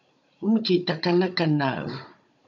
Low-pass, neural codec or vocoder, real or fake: 7.2 kHz; codec, 16 kHz, 16 kbps, FunCodec, trained on Chinese and English, 50 frames a second; fake